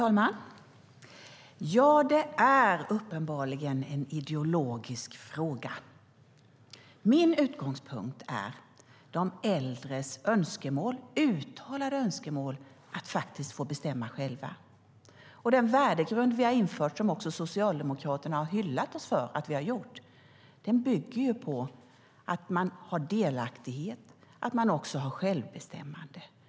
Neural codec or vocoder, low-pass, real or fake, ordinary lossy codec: none; none; real; none